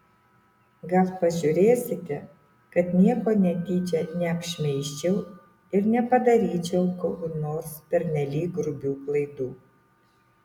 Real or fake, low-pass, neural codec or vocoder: real; 19.8 kHz; none